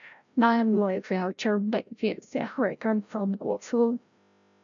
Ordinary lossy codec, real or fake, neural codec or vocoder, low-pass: MP3, 96 kbps; fake; codec, 16 kHz, 0.5 kbps, FreqCodec, larger model; 7.2 kHz